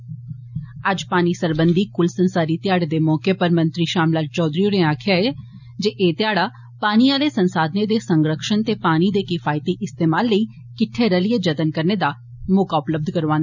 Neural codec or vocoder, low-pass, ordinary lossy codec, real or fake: none; 7.2 kHz; none; real